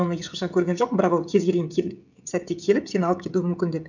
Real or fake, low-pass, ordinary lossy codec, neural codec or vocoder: fake; 7.2 kHz; none; codec, 16 kHz, 16 kbps, FreqCodec, smaller model